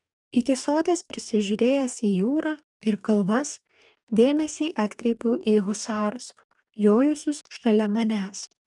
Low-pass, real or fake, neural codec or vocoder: 10.8 kHz; fake; codec, 44.1 kHz, 2.6 kbps, DAC